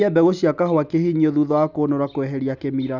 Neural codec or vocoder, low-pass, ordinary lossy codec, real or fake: none; 7.2 kHz; none; real